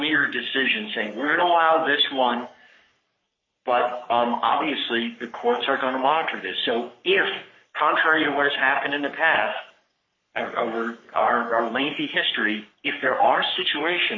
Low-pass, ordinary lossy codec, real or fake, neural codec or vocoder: 7.2 kHz; MP3, 32 kbps; fake; codec, 44.1 kHz, 3.4 kbps, Pupu-Codec